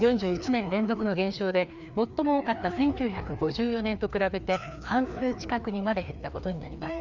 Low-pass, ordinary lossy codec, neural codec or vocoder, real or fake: 7.2 kHz; none; codec, 16 kHz, 2 kbps, FreqCodec, larger model; fake